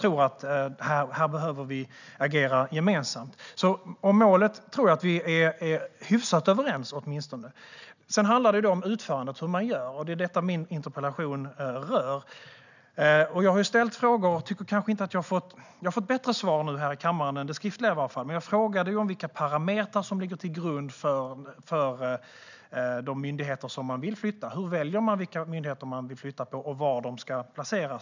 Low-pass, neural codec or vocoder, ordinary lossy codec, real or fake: 7.2 kHz; none; none; real